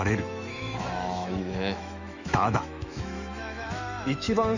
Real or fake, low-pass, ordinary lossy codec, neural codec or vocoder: fake; 7.2 kHz; none; autoencoder, 48 kHz, 128 numbers a frame, DAC-VAE, trained on Japanese speech